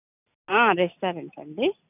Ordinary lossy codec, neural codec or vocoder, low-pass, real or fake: none; none; 3.6 kHz; real